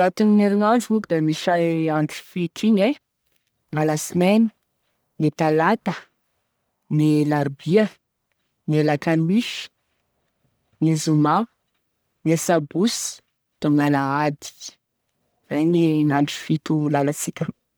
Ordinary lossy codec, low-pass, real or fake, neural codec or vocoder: none; none; fake; codec, 44.1 kHz, 1.7 kbps, Pupu-Codec